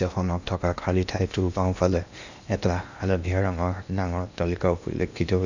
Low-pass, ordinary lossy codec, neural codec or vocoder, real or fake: 7.2 kHz; none; codec, 16 kHz in and 24 kHz out, 0.8 kbps, FocalCodec, streaming, 65536 codes; fake